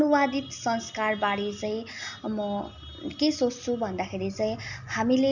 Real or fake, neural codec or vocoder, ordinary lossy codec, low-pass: real; none; none; 7.2 kHz